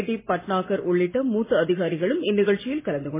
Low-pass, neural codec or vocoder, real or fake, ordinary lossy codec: 3.6 kHz; none; real; MP3, 16 kbps